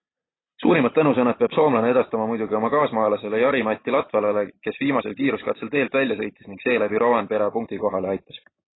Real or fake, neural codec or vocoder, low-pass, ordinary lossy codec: real; none; 7.2 kHz; AAC, 16 kbps